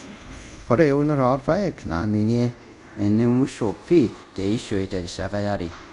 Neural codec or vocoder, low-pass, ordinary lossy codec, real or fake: codec, 24 kHz, 0.5 kbps, DualCodec; 10.8 kHz; none; fake